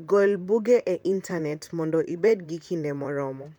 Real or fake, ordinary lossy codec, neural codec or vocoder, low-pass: fake; MP3, 96 kbps; vocoder, 44.1 kHz, 128 mel bands, Pupu-Vocoder; 19.8 kHz